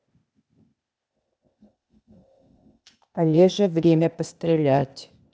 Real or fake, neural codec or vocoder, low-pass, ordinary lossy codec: fake; codec, 16 kHz, 0.8 kbps, ZipCodec; none; none